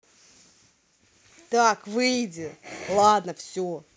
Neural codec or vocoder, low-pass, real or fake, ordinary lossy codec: none; none; real; none